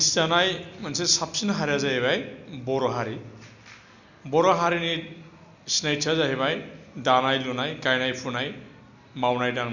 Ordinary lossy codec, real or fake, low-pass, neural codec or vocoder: none; real; 7.2 kHz; none